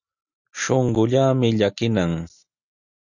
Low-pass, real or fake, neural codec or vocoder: 7.2 kHz; real; none